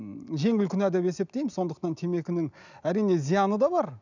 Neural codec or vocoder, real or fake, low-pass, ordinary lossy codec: none; real; 7.2 kHz; none